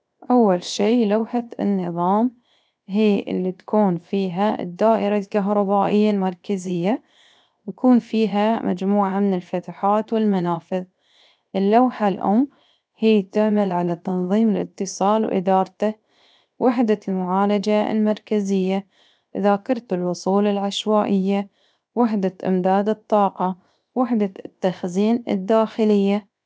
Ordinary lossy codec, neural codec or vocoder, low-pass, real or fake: none; codec, 16 kHz, 0.7 kbps, FocalCodec; none; fake